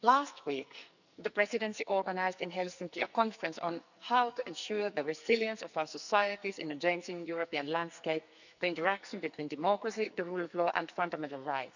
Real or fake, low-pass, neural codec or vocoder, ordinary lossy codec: fake; 7.2 kHz; codec, 44.1 kHz, 2.6 kbps, SNAC; none